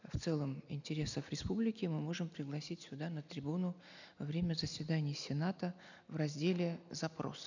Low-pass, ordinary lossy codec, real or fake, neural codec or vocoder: 7.2 kHz; none; real; none